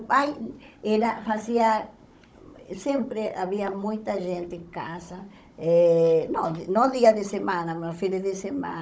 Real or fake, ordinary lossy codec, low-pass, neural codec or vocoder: fake; none; none; codec, 16 kHz, 16 kbps, FunCodec, trained on Chinese and English, 50 frames a second